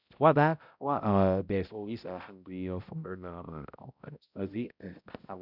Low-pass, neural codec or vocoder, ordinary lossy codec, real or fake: 5.4 kHz; codec, 16 kHz, 0.5 kbps, X-Codec, HuBERT features, trained on balanced general audio; none; fake